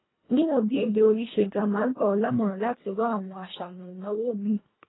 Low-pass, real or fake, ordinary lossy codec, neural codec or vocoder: 7.2 kHz; fake; AAC, 16 kbps; codec, 24 kHz, 1.5 kbps, HILCodec